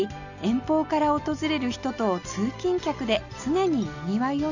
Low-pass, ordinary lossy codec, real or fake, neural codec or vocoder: 7.2 kHz; none; real; none